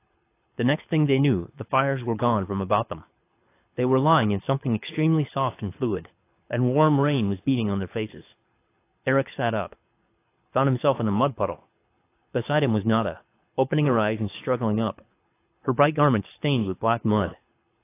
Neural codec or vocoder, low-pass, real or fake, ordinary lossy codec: codec, 24 kHz, 6 kbps, HILCodec; 3.6 kHz; fake; AAC, 24 kbps